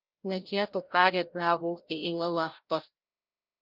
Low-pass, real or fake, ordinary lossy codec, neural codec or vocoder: 5.4 kHz; fake; Opus, 24 kbps; codec, 16 kHz, 0.5 kbps, FreqCodec, larger model